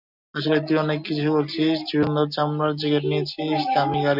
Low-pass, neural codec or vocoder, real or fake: 5.4 kHz; none; real